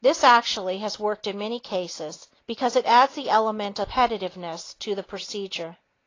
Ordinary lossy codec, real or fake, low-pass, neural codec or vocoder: AAC, 32 kbps; real; 7.2 kHz; none